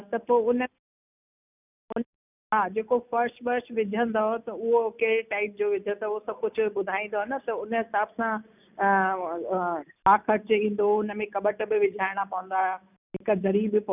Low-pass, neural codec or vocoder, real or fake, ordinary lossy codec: 3.6 kHz; none; real; none